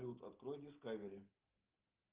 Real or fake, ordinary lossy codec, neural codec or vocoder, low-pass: real; Opus, 24 kbps; none; 3.6 kHz